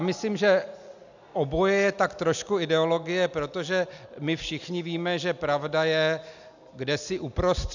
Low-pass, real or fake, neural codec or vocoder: 7.2 kHz; real; none